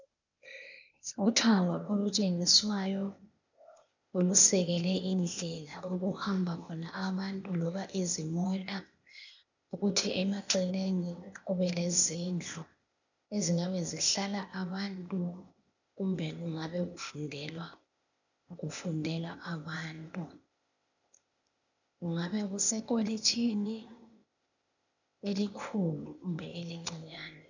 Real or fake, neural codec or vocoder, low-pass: fake; codec, 16 kHz, 0.8 kbps, ZipCodec; 7.2 kHz